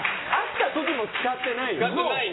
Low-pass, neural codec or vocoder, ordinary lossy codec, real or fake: 7.2 kHz; none; AAC, 16 kbps; real